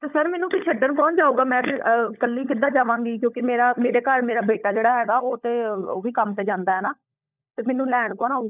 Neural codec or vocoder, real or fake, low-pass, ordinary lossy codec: codec, 16 kHz, 16 kbps, FunCodec, trained on LibriTTS, 50 frames a second; fake; 3.6 kHz; none